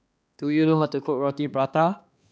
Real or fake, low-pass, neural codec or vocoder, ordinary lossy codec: fake; none; codec, 16 kHz, 2 kbps, X-Codec, HuBERT features, trained on balanced general audio; none